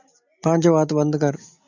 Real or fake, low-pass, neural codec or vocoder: real; 7.2 kHz; none